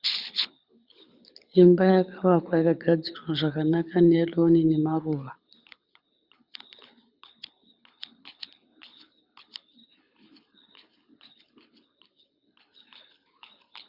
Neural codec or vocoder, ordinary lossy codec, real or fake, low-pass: codec, 24 kHz, 6 kbps, HILCodec; Opus, 64 kbps; fake; 5.4 kHz